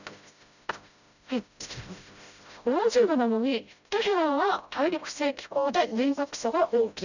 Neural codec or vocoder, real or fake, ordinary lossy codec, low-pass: codec, 16 kHz, 0.5 kbps, FreqCodec, smaller model; fake; none; 7.2 kHz